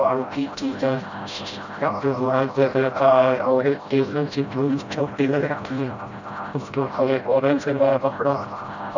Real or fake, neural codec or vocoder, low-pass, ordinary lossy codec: fake; codec, 16 kHz, 0.5 kbps, FreqCodec, smaller model; 7.2 kHz; none